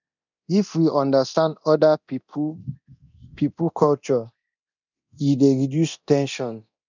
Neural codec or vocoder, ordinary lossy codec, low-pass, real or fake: codec, 24 kHz, 0.9 kbps, DualCodec; none; 7.2 kHz; fake